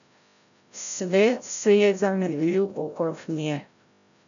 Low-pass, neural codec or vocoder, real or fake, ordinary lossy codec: 7.2 kHz; codec, 16 kHz, 0.5 kbps, FreqCodec, larger model; fake; none